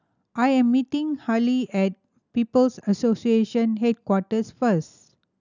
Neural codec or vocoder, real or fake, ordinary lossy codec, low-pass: none; real; none; 7.2 kHz